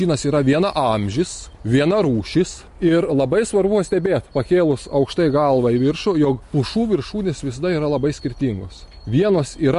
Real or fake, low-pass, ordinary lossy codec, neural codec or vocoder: real; 14.4 kHz; MP3, 48 kbps; none